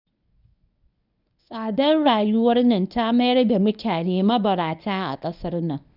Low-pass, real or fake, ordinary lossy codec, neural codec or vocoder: 5.4 kHz; fake; none; codec, 24 kHz, 0.9 kbps, WavTokenizer, medium speech release version 1